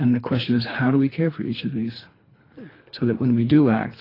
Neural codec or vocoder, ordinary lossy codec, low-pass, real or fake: codec, 24 kHz, 3 kbps, HILCodec; AAC, 24 kbps; 5.4 kHz; fake